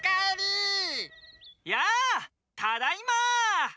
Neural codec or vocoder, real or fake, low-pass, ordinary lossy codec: none; real; none; none